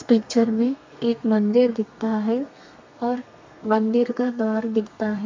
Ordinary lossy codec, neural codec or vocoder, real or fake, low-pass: MP3, 48 kbps; codec, 32 kHz, 1.9 kbps, SNAC; fake; 7.2 kHz